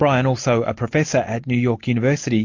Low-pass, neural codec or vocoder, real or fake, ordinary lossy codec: 7.2 kHz; none; real; MP3, 48 kbps